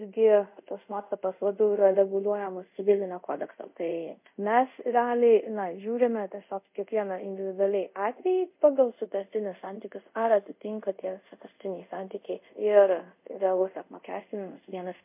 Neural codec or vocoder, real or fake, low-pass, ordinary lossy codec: codec, 24 kHz, 0.5 kbps, DualCodec; fake; 3.6 kHz; MP3, 32 kbps